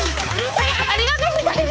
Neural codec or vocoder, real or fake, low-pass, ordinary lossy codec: codec, 16 kHz, 4 kbps, X-Codec, HuBERT features, trained on balanced general audio; fake; none; none